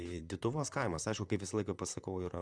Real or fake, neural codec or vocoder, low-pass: fake; vocoder, 44.1 kHz, 128 mel bands, Pupu-Vocoder; 9.9 kHz